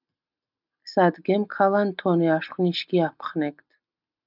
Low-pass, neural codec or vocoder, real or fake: 5.4 kHz; none; real